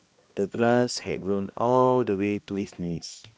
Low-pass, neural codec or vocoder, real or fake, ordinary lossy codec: none; codec, 16 kHz, 1 kbps, X-Codec, HuBERT features, trained on balanced general audio; fake; none